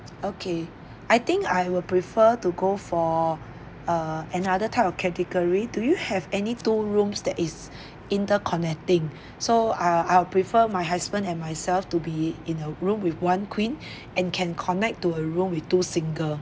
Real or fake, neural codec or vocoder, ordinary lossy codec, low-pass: real; none; none; none